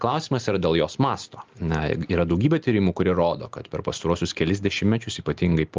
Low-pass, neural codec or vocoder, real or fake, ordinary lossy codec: 7.2 kHz; none; real; Opus, 32 kbps